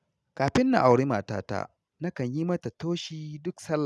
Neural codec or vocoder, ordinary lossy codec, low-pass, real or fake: none; none; none; real